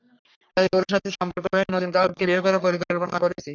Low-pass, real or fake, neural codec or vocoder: 7.2 kHz; fake; codec, 44.1 kHz, 3.4 kbps, Pupu-Codec